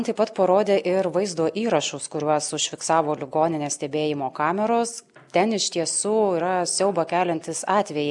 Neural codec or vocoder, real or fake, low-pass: none; real; 10.8 kHz